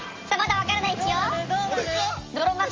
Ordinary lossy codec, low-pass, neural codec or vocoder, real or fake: Opus, 32 kbps; 7.2 kHz; none; real